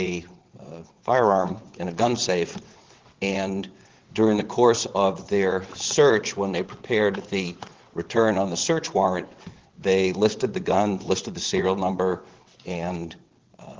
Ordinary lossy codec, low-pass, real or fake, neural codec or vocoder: Opus, 16 kbps; 7.2 kHz; fake; vocoder, 22.05 kHz, 80 mel bands, WaveNeXt